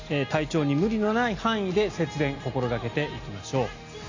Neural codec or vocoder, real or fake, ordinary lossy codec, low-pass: none; real; AAC, 48 kbps; 7.2 kHz